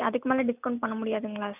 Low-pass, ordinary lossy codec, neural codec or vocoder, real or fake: 3.6 kHz; none; none; real